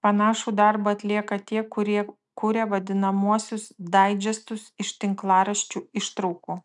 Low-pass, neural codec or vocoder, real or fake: 10.8 kHz; none; real